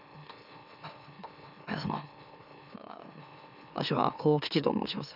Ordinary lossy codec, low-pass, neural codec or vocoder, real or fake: none; 5.4 kHz; autoencoder, 44.1 kHz, a latent of 192 numbers a frame, MeloTTS; fake